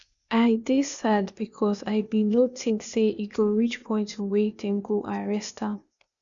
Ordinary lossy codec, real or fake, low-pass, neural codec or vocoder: none; fake; 7.2 kHz; codec, 16 kHz, 0.8 kbps, ZipCodec